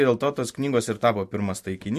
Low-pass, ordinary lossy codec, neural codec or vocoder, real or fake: 14.4 kHz; MP3, 64 kbps; none; real